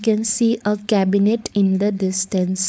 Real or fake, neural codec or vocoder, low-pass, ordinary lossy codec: fake; codec, 16 kHz, 4.8 kbps, FACodec; none; none